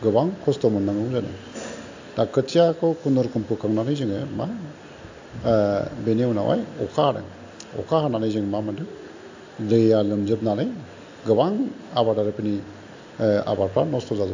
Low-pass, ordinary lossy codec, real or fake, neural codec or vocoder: 7.2 kHz; AAC, 48 kbps; real; none